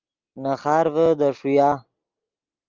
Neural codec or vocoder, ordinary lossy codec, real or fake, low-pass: none; Opus, 32 kbps; real; 7.2 kHz